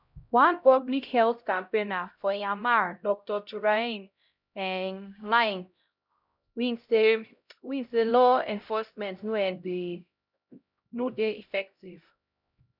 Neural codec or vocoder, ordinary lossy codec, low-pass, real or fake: codec, 16 kHz, 0.5 kbps, X-Codec, HuBERT features, trained on LibriSpeech; none; 5.4 kHz; fake